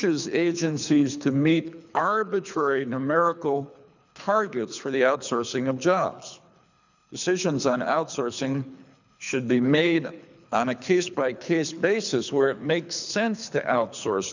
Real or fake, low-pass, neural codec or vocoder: fake; 7.2 kHz; codec, 24 kHz, 3 kbps, HILCodec